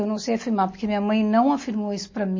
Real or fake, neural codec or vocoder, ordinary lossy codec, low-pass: real; none; MP3, 32 kbps; 7.2 kHz